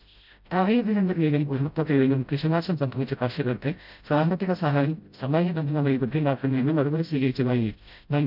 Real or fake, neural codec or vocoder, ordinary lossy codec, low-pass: fake; codec, 16 kHz, 0.5 kbps, FreqCodec, smaller model; none; 5.4 kHz